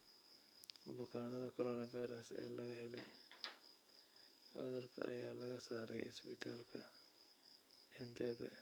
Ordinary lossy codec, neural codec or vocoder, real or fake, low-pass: none; codec, 44.1 kHz, 2.6 kbps, SNAC; fake; none